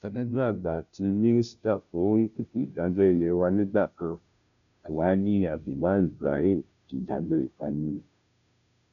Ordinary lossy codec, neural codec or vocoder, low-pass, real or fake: none; codec, 16 kHz, 0.5 kbps, FunCodec, trained on Chinese and English, 25 frames a second; 7.2 kHz; fake